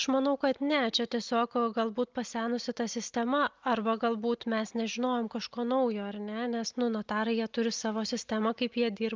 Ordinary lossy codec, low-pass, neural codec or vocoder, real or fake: Opus, 24 kbps; 7.2 kHz; none; real